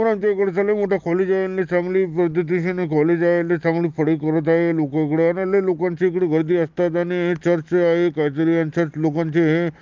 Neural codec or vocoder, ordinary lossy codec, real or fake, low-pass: none; Opus, 32 kbps; real; 7.2 kHz